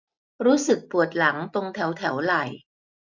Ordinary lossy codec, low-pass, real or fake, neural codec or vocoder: none; 7.2 kHz; real; none